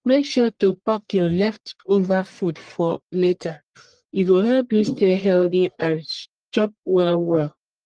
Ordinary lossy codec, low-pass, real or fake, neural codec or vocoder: Opus, 24 kbps; 9.9 kHz; fake; codec, 44.1 kHz, 1.7 kbps, Pupu-Codec